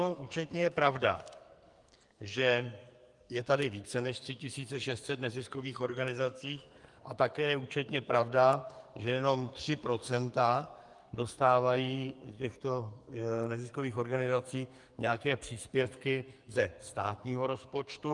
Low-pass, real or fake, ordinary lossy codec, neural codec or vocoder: 10.8 kHz; fake; Opus, 32 kbps; codec, 44.1 kHz, 2.6 kbps, SNAC